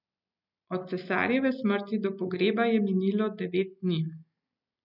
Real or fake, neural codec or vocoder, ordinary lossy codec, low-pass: real; none; none; 5.4 kHz